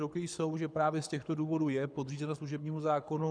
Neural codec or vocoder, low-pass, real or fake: codec, 24 kHz, 6 kbps, HILCodec; 9.9 kHz; fake